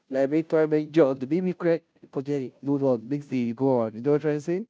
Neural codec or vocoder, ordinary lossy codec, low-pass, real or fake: codec, 16 kHz, 0.5 kbps, FunCodec, trained on Chinese and English, 25 frames a second; none; none; fake